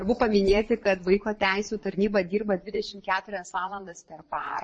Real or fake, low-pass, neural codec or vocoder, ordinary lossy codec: fake; 9.9 kHz; vocoder, 22.05 kHz, 80 mel bands, Vocos; MP3, 32 kbps